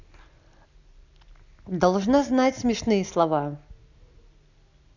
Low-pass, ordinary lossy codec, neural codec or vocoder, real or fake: 7.2 kHz; none; none; real